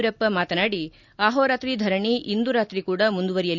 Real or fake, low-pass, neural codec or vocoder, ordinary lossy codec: real; 7.2 kHz; none; none